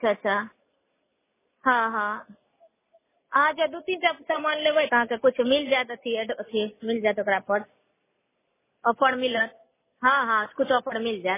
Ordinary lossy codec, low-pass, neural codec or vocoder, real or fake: MP3, 16 kbps; 3.6 kHz; none; real